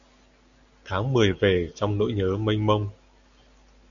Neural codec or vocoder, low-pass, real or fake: none; 7.2 kHz; real